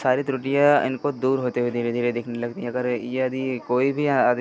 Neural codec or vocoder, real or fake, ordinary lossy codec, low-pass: none; real; none; none